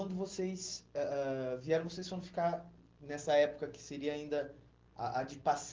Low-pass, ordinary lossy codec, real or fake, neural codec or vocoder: 7.2 kHz; Opus, 16 kbps; real; none